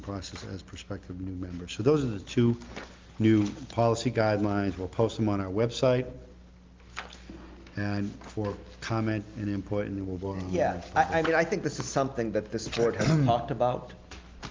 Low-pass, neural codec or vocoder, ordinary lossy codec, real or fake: 7.2 kHz; none; Opus, 32 kbps; real